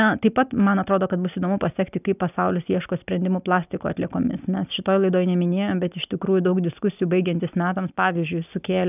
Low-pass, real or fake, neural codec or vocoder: 3.6 kHz; real; none